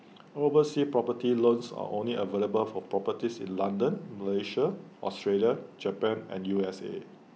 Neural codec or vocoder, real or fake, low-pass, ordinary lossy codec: none; real; none; none